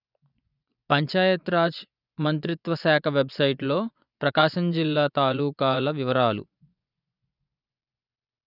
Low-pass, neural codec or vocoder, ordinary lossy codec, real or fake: 5.4 kHz; vocoder, 24 kHz, 100 mel bands, Vocos; none; fake